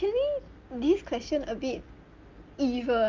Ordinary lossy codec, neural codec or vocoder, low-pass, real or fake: Opus, 32 kbps; none; 7.2 kHz; real